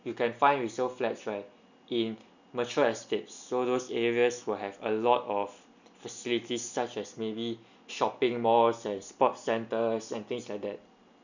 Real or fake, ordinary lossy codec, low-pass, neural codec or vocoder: real; none; 7.2 kHz; none